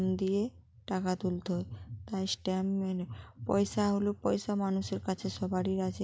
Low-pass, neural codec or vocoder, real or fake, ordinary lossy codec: none; none; real; none